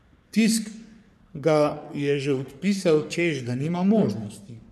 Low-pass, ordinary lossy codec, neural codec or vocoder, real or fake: 14.4 kHz; none; codec, 44.1 kHz, 3.4 kbps, Pupu-Codec; fake